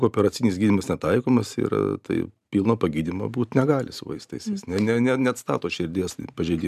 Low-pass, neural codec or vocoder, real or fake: 14.4 kHz; vocoder, 44.1 kHz, 128 mel bands every 512 samples, BigVGAN v2; fake